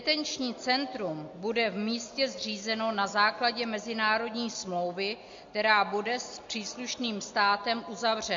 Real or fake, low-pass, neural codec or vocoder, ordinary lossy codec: real; 7.2 kHz; none; MP3, 48 kbps